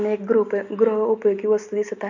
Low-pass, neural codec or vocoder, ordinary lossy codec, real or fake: 7.2 kHz; none; none; real